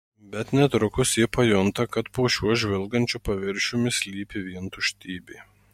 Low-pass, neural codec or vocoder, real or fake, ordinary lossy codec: 19.8 kHz; none; real; MP3, 64 kbps